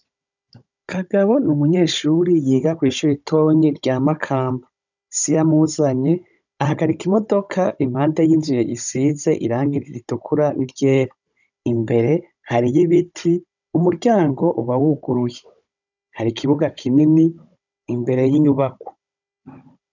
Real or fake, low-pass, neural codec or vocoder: fake; 7.2 kHz; codec, 16 kHz, 16 kbps, FunCodec, trained on Chinese and English, 50 frames a second